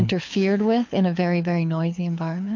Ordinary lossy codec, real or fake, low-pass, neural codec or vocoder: MP3, 48 kbps; fake; 7.2 kHz; codec, 24 kHz, 6 kbps, HILCodec